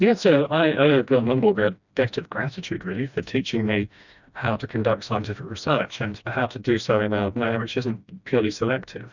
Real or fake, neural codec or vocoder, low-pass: fake; codec, 16 kHz, 1 kbps, FreqCodec, smaller model; 7.2 kHz